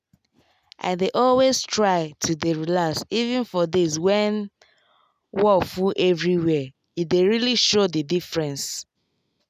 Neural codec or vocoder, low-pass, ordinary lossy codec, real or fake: none; 14.4 kHz; none; real